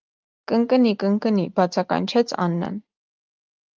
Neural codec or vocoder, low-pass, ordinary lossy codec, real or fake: none; 7.2 kHz; Opus, 24 kbps; real